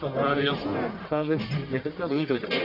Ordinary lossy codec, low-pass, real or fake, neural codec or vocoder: none; 5.4 kHz; fake; codec, 44.1 kHz, 1.7 kbps, Pupu-Codec